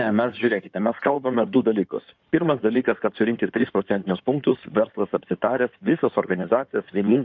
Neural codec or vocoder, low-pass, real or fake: codec, 16 kHz in and 24 kHz out, 2.2 kbps, FireRedTTS-2 codec; 7.2 kHz; fake